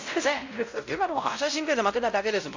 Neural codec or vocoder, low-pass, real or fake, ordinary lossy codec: codec, 16 kHz, 0.5 kbps, X-Codec, WavLM features, trained on Multilingual LibriSpeech; 7.2 kHz; fake; AAC, 48 kbps